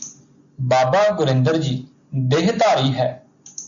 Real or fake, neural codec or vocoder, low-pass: real; none; 7.2 kHz